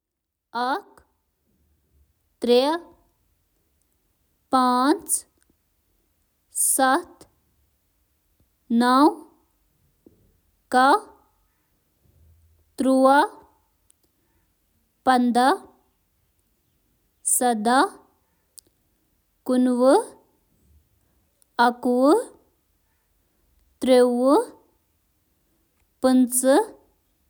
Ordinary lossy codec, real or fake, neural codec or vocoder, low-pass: none; real; none; none